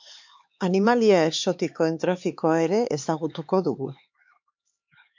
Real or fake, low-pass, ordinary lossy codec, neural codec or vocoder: fake; 7.2 kHz; MP3, 48 kbps; codec, 16 kHz, 4 kbps, X-Codec, HuBERT features, trained on LibriSpeech